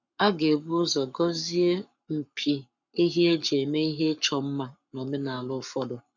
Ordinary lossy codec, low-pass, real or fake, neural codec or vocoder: none; 7.2 kHz; fake; codec, 44.1 kHz, 7.8 kbps, Pupu-Codec